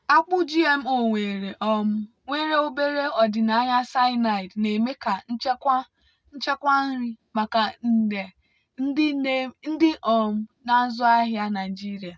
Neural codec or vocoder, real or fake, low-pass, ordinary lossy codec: none; real; none; none